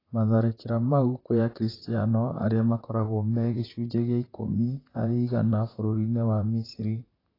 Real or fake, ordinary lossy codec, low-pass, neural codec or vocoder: fake; AAC, 24 kbps; 5.4 kHz; vocoder, 44.1 kHz, 128 mel bands, Pupu-Vocoder